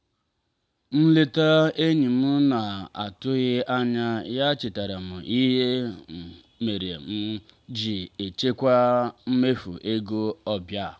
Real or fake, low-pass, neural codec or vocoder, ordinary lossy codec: real; none; none; none